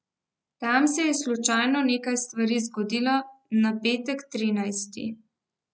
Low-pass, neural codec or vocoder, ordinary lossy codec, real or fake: none; none; none; real